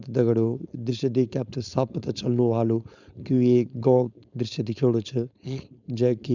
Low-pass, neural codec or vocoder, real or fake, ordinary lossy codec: 7.2 kHz; codec, 16 kHz, 4.8 kbps, FACodec; fake; none